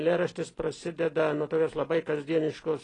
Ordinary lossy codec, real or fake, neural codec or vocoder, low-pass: AAC, 32 kbps; real; none; 10.8 kHz